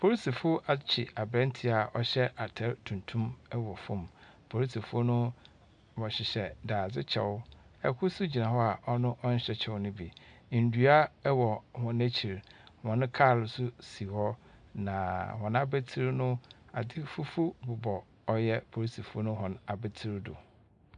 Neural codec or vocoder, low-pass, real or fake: none; 9.9 kHz; real